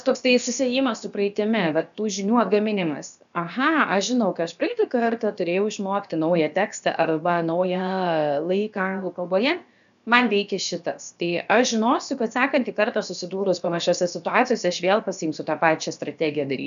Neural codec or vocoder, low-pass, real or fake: codec, 16 kHz, about 1 kbps, DyCAST, with the encoder's durations; 7.2 kHz; fake